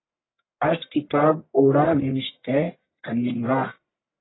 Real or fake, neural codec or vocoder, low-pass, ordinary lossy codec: fake; codec, 44.1 kHz, 1.7 kbps, Pupu-Codec; 7.2 kHz; AAC, 16 kbps